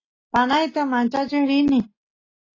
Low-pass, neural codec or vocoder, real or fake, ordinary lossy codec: 7.2 kHz; none; real; AAC, 32 kbps